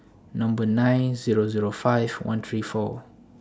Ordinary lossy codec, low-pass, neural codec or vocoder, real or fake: none; none; none; real